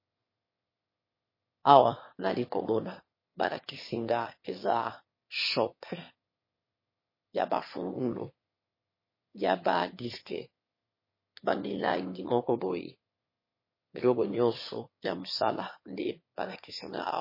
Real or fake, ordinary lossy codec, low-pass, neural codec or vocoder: fake; MP3, 24 kbps; 5.4 kHz; autoencoder, 22.05 kHz, a latent of 192 numbers a frame, VITS, trained on one speaker